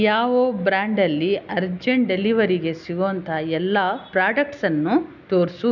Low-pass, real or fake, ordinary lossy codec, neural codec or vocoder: 7.2 kHz; real; none; none